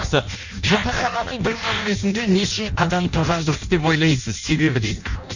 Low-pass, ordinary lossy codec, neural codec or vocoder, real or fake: 7.2 kHz; none; codec, 16 kHz in and 24 kHz out, 0.6 kbps, FireRedTTS-2 codec; fake